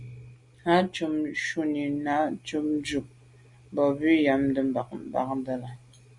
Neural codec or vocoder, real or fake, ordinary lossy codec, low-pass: none; real; Opus, 64 kbps; 10.8 kHz